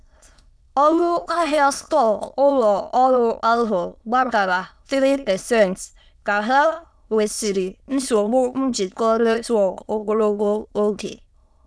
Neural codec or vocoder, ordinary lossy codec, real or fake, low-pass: autoencoder, 22.05 kHz, a latent of 192 numbers a frame, VITS, trained on many speakers; none; fake; none